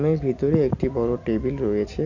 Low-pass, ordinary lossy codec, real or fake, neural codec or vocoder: 7.2 kHz; none; fake; vocoder, 44.1 kHz, 128 mel bands every 256 samples, BigVGAN v2